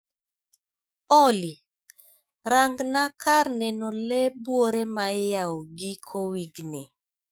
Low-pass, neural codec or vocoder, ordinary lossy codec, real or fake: none; codec, 44.1 kHz, 7.8 kbps, DAC; none; fake